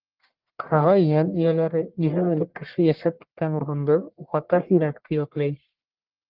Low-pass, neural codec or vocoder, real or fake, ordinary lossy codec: 5.4 kHz; codec, 44.1 kHz, 1.7 kbps, Pupu-Codec; fake; Opus, 32 kbps